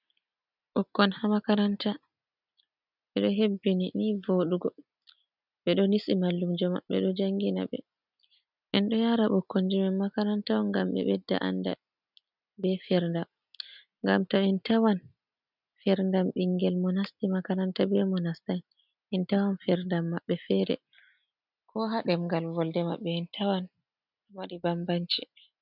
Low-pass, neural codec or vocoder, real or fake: 5.4 kHz; none; real